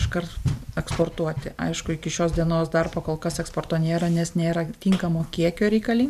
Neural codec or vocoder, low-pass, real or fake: vocoder, 44.1 kHz, 128 mel bands every 512 samples, BigVGAN v2; 14.4 kHz; fake